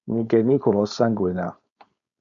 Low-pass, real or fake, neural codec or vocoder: 7.2 kHz; fake; codec, 16 kHz, 4.8 kbps, FACodec